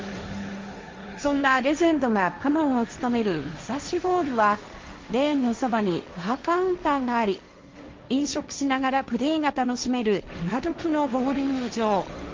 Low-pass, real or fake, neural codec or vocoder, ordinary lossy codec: 7.2 kHz; fake; codec, 16 kHz, 1.1 kbps, Voila-Tokenizer; Opus, 32 kbps